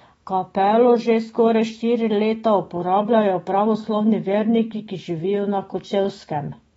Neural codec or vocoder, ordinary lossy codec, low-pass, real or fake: autoencoder, 48 kHz, 128 numbers a frame, DAC-VAE, trained on Japanese speech; AAC, 24 kbps; 19.8 kHz; fake